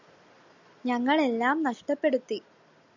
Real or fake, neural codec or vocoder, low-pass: real; none; 7.2 kHz